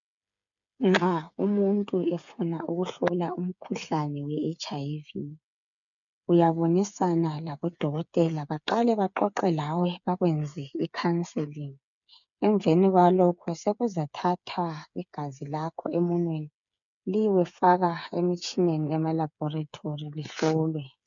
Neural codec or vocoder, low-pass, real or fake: codec, 16 kHz, 8 kbps, FreqCodec, smaller model; 7.2 kHz; fake